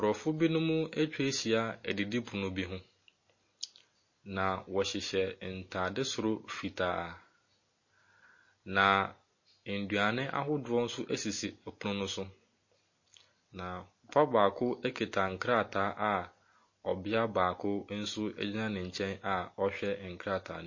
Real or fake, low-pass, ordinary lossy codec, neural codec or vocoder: real; 7.2 kHz; MP3, 32 kbps; none